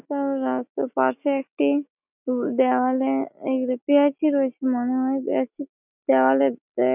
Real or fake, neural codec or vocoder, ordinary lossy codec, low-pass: real; none; none; 3.6 kHz